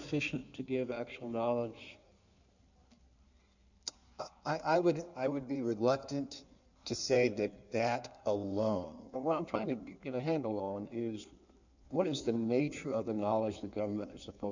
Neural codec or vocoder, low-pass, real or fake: codec, 16 kHz in and 24 kHz out, 1.1 kbps, FireRedTTS-2 codec; 7.2 kHz; fake